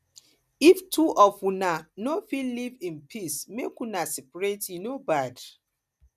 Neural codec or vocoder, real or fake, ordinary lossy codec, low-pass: none; real; none; 14.4 kHz